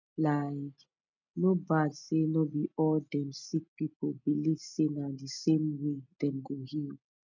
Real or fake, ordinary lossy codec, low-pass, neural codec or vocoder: real; none; 7.2 kHz; none